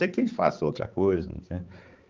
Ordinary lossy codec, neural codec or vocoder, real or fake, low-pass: Opus, 24 kbps; codec, 16 kHz, 4 kbps, X-Codec, HuBERT features, trained on balanced general audio; fake; 7.2 kHz